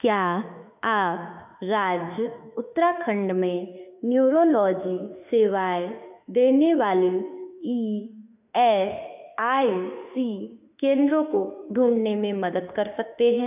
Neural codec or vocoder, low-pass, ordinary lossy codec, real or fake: autoencoder, 48 kHz, 32 numbers a frame, DAC-VAE, trained on Japanese speech; 3.6 kHz; none; fake